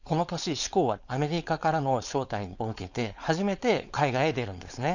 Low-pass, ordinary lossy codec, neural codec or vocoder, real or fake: 7.2 kHz; none; codec, 16 kHz, 4.8 kbps, FACodec; fake